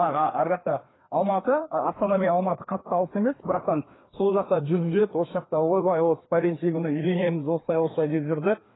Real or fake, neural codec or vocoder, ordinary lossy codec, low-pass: fake; codec, 16 kHz, 2 kbps, FreqCodec, larger model; AAC, 16 kbps; 7.2 kHz